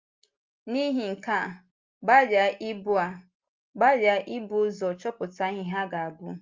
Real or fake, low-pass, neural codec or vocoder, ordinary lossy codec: real; 7.2 kHz; none; Opus, 32 kbps